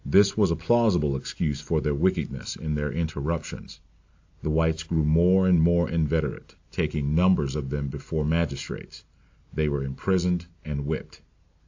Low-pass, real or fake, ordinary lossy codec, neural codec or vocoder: 7.2 kHz; real; AAC, 48 kbps; none